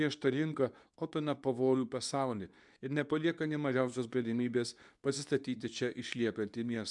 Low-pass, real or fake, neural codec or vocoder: 10.8 kHz; fake; codec, 24 kHz, 0.9 kbps, WavTokenizer, medium speech release version 2